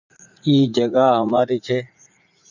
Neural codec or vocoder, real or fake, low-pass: vocoder, 44.1 kHz, 80 mel bands, Vocos; fake; 7.2 kHz